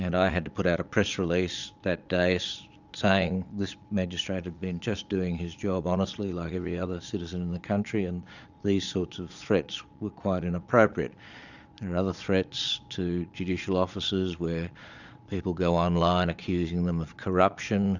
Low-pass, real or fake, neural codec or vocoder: 7.2 kHz; fake; vocoder, 22.05 kHz, 80 mel bands, Vocos